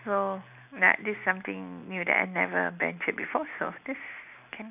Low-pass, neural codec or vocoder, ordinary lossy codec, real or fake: 3.6 kHz; none; none; real